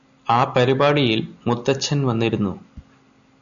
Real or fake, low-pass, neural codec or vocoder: real; 7.2 kHz; none